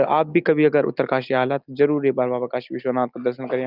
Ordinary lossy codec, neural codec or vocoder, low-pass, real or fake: Opus, 24 kbps; none; 5.4 kHz; real